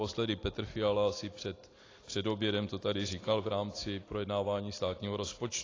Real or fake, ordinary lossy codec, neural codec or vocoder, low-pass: real; AAC, 32 kbps; none; 7.2 kHz